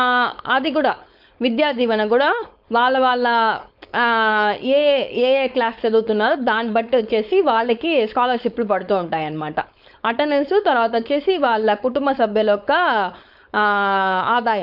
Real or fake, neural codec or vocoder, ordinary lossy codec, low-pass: fake; codec, 16 kHz, 4.8 kbps, FACodec; none; 5.4 kHz